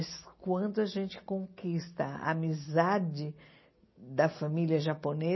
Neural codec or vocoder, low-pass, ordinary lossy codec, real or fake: none; 7.2 kHz; MP3, 24 kbps; real